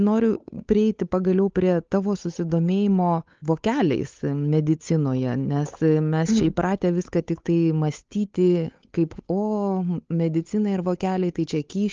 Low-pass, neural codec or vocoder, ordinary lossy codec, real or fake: 7.2 kHz; codec, 16 kHz, 8 kbps, FunCodec, trained on Chinese and English, 25 frames a second; Opus, 24 kbps; fake